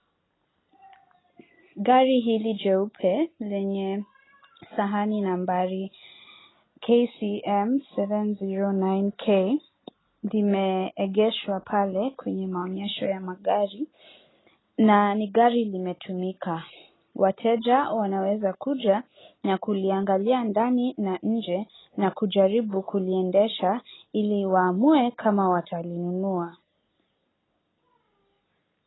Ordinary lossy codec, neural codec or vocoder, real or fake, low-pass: AAC, 16 kbps; none; real; 7.2 kHz